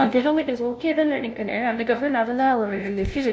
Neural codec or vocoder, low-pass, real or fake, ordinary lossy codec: codec, 16 kHz, 0.5 kbps, FunCodec, trained on LibriTTS, 25 frames a second; none; fake; none